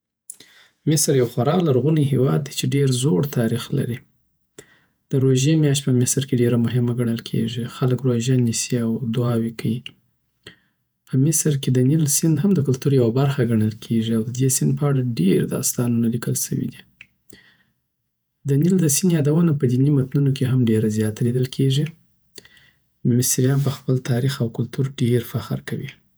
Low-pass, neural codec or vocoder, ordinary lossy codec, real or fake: none; vocoder, 48 kHz, 128 mel bands, Vocos; none; fake